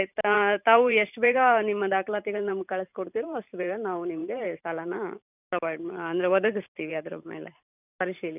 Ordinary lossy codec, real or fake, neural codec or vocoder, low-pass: none; fake; vocoder, 44.1 kHz, 128 mel bands every 512 samples, BigVGAN v2; 3.6 kHz